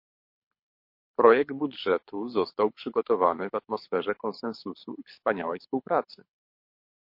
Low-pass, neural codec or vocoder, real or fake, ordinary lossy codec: 5.4 kHz; codec, 24 kHz, 6 kbps, HILCodec; fake; MP3, 32 kbps